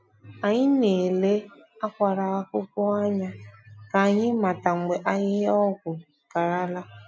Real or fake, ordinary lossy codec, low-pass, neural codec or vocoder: real; none; none; none